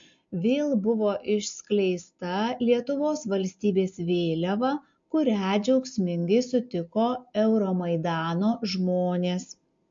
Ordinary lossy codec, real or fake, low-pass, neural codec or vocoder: MP3, 48 kbps; real; 7.2 kHz; none